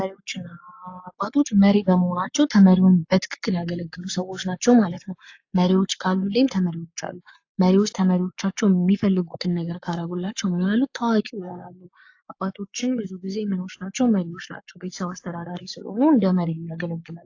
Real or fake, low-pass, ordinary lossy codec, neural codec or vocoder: fake; 7.2 kHz; AAC, 48 kbps; codec, 44.1 kHz, 7.8 kbps, Pupu-Codec